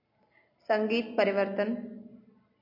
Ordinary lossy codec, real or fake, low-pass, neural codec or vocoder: MP3, 32 kbps; real; 5.4 kHz; none